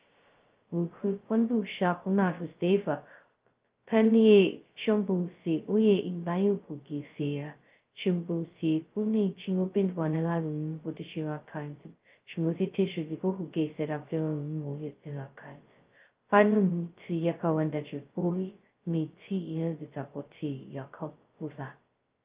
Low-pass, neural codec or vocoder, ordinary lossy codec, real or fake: 3.6 kHz; codec, 16 kHz, 0.2 kbps, FocalCodec; Opus, 32 kbps; fake